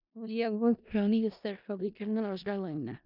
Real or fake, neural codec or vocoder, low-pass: fake; codec, 16 kHz in and 24 kHz out, 0.4 kbps, LongCat-Audio-Codec, four codebook decoder; 5.4 kHz